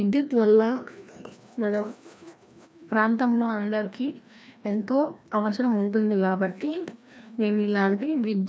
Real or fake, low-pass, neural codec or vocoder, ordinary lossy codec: fake; none; codec, 16 kHz, 1 kbps, FreqCodec, larger model; none